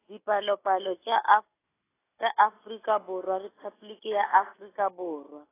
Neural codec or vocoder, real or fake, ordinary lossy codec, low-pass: none; real; AAC, 16 kbps; 3.6 kHz